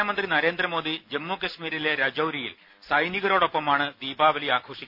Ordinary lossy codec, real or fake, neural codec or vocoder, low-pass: none; real; none; 5.4 kHz